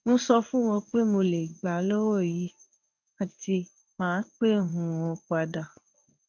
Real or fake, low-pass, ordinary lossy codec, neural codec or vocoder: fake; 7.2 kHz; none; codec, 16 kHz in and 24 kHz out, 1 kbps, XY-Tokenizer